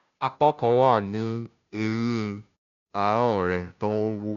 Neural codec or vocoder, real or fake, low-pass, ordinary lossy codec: codec, 16 kHz, 0.5 kbps, FunCodec, trained on Chinese and English, 25 frames a second; fake; 7.2 kHz; none